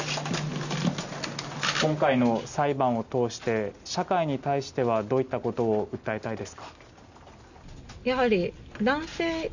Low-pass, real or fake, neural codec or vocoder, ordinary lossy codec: 7.2 kHz; real; none; none